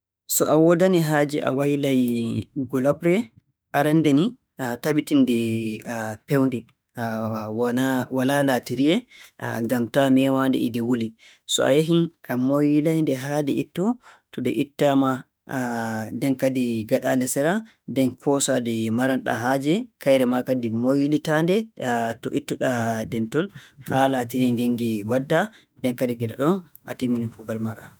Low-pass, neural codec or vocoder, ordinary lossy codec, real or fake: none; autoencoder, 48 kHz, 32 numbers a frame, DAC-VAE, trained on Japanese speech; none; fake